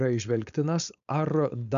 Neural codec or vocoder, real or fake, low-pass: codec, 16 kHz, 4.8 kbps, FACodec; fake; 7.2 kHz